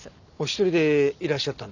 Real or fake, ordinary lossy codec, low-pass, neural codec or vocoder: real; none; 7.2 kHz; none